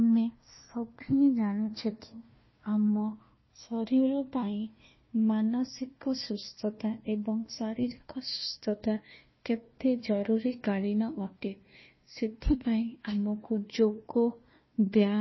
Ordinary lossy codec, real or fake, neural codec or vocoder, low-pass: MP3, 24 kbps; fake; codec, 16 kHz, 1 kbps, FunCodec, trained on Chinese and English, 50 frames a second; 7.2 kHz